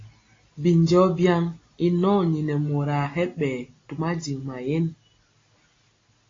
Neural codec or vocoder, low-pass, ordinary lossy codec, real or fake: none; 7.2 kHz; AAC, 32 kbps; real